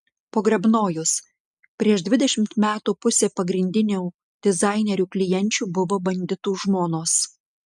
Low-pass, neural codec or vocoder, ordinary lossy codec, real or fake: 10.8 kHz; none; MP3, 96 kbps; real